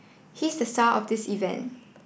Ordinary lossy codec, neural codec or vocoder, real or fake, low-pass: none; none; real; none